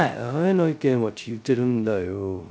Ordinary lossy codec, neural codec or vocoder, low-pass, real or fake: none; codec, 16 kHz, 0.2 kbps, FocalCodec; none; fake